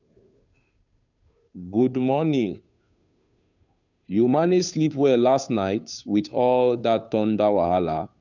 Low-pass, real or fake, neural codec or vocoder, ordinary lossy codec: 7.2 kHz; fake; codec, 16 kHz, 2 kbps, FunCodec, trained on Chinese and English, 25 frames a second; none